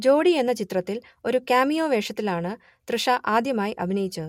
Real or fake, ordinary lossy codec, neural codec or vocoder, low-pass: real; MP3, 64 kbps; none; 19.8 kHz